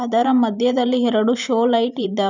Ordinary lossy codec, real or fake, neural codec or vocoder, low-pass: none; fake; vocoder, 44.1 kHz, 128 mel bands every 512 samples, BigVGAN v2; 7.2 kHz